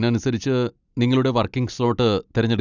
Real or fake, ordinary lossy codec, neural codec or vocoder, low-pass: real; none; none; 7.2 kHz